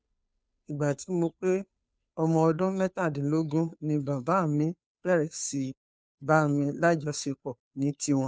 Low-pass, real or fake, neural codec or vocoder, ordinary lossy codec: none; fake; codec, 16 kHz, 2 kbps, FunCodec, trained on Chinese and English, 25 frames a second; none